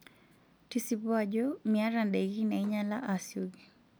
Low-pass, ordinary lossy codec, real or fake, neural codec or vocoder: none; none; real; none